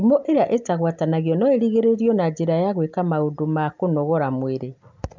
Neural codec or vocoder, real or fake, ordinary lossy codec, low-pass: none; real; MP3, 64 kbps; 7.2 kHz